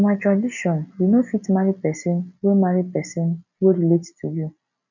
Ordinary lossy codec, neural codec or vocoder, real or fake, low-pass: none; none; real; 7.2 kHz